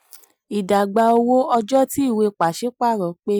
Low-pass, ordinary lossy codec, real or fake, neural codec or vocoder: none; none; real; none